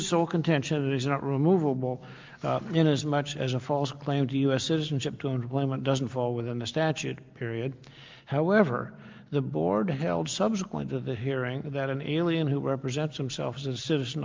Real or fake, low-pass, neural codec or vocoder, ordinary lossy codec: real; 7.2 kHz; none; Opus, 24 kbps